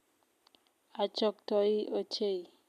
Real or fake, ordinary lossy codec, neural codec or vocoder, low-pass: real; none; none; 14.4 kHz